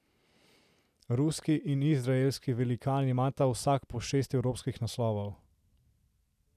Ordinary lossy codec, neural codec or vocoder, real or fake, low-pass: none; none; real; 14.4 kHz